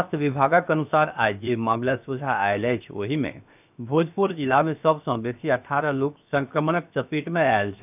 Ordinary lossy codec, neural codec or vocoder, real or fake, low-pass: none; codec, 16 kHz, 0.7 kbps, FocalCodec; fake; 3.6 kHz